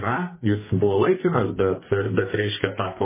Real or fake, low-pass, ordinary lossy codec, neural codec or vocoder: fake; 3.6 kHz; MP3, 16 kbps; codec, 32 kHz, 1.9 kbps, SNAC